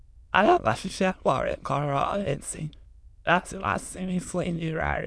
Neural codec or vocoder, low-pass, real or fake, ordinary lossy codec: autoencoder, 22.05 kHz, a latent of 192 numbers a frame, VITS, trained on many speakers; none; fake; none